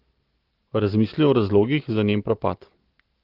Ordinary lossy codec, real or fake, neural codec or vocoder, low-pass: Opus, 16 kbps; real; none; 5.4 kHz